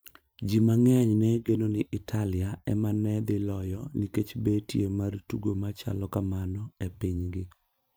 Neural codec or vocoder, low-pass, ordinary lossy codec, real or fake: vocoder, 44.1 kHz, 128 mel bands every 512 samples, BigVGAN v2; none; none; fake